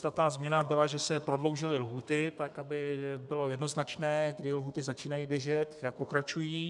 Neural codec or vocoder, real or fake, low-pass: codec, 32 kHz, 1.9 kbps, SNAC; fake; 10.8 kHz